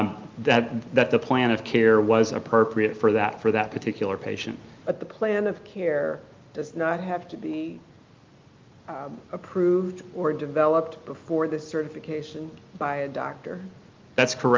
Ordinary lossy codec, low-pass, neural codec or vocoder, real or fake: Opus, 24 kbps; 7.2 kHz; none; real